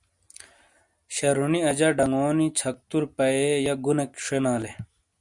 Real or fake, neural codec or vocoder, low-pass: real; none; 10.8 kHz